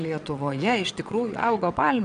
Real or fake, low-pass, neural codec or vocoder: fake; 9.9 kHz; vocoder, 22.05 kHz, 80 mel bands, WaveNeXt